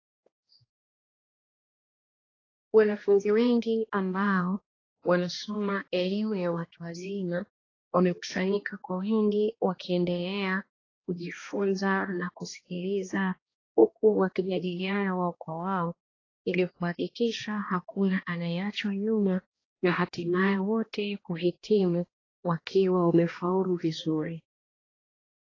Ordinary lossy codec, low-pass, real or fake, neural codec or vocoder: AAC, 32 kbps; 7.2 kHz; fake; codec, 16 kHz, 1 kbps, X-Codec, HuBERT features, trained on balanced general audio